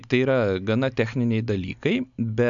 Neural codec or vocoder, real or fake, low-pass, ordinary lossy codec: none; real; 7.2 kHz; MP3, 96 kbps